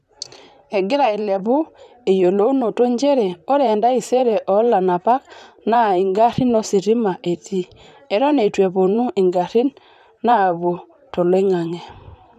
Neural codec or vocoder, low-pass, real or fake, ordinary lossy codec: vocoder, 44.1 kHz, 128 mel bands, Pupu-Vocoder; 14.4 kHz; fake; none